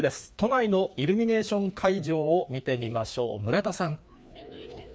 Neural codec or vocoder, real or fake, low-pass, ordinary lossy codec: codec, 16 kHz, 2 kbps, FreqCodec, larger model; fake; none; none